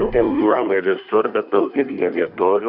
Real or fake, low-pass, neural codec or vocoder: fake; 5.4 kHz; codec, 24 kHz, 1 kbps, SNAC